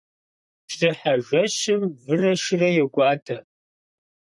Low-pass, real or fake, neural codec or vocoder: 10.8 kHz; fake; vocoder, 44.1 kHz, 128 mel bands, Pupu-Vocoder